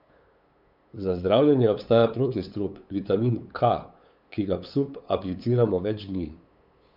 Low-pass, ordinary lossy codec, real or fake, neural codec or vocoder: 5.4 kHz; none; fake; codec, 16 kHz, 8 kbps, FunCodec, trained on LibriTTS, 25 frames a second